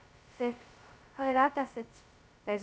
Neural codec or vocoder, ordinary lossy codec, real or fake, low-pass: codec, 16 kHz, 0.2 kbps, FocalCodec; none; fake; none